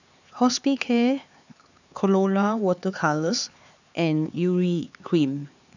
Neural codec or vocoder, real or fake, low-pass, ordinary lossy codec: codec, 16 kHz, 4 kbps, X-Codec, HuBERT features, trained on LibriSpeech; fake; 7.2 kHz; none